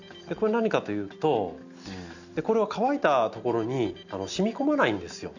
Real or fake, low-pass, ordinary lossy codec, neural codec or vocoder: real; 7.2 kHz; none; none